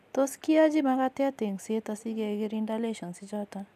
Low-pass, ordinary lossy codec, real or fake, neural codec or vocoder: 14.4 kHz; none; real; none